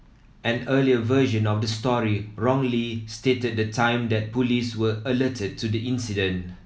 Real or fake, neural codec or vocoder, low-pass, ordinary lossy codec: real; none; none; none